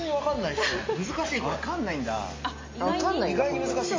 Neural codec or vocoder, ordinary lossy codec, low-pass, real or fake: none; MP3, 32 kbps; 7.2 kHz; real